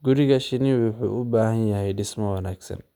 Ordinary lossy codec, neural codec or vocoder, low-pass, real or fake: none; none; 19.8 kHz; real